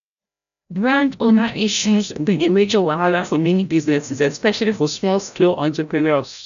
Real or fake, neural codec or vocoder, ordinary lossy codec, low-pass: fake; codec, 16 kHz, 0.5 kbps, FreqCodec, larger model; none; 7.2 kHz